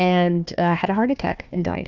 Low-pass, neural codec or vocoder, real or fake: 7.2 kHz; codec, 16 kHz, 1 kbps, FunCodec, trained on Chinese and English, 50 frames a second; fake